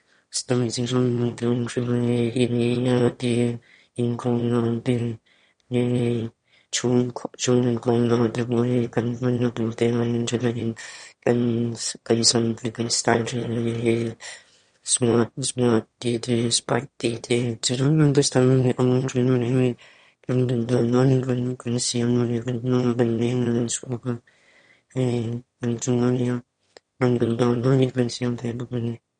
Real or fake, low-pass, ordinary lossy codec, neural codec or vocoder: fake; 9.9 kHz; MP3, 48 kbps; autoencoder, 22.05 kHz, a latent of 192 numbers a frame, VITS, trained on one speaker